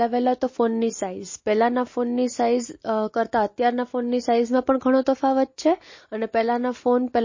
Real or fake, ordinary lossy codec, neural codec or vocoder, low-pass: real; MP3, 32 kbps; none; 7.2 kHz